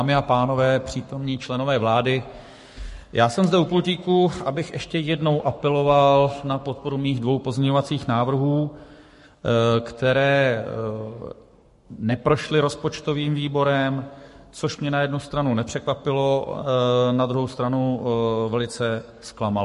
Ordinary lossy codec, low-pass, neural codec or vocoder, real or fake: MP3, 48 kbps; 14.4 kHz; codec, 44.1 kHz, 7.8 kbps, Pupu-Codec; fake